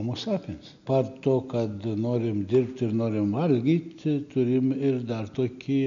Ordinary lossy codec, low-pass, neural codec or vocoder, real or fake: MP3, 64 kbps; 7.2 kHz; none; real